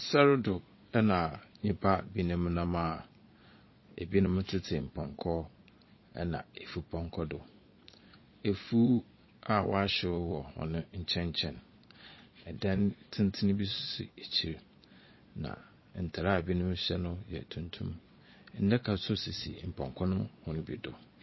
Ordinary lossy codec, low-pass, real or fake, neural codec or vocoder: MP3, 24 kbps; 7.2 kHz; fake; vocoder, 22.05 kHz, 80 mel bands, Vocos